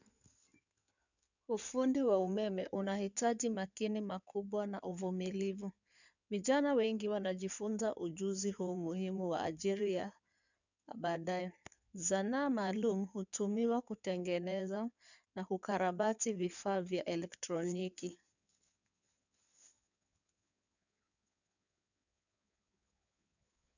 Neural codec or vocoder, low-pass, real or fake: codec, 16 kHz in and 24 kHz out, 2.2 kbps, FireRedTTS-2 codec; 7.2 kHz; fake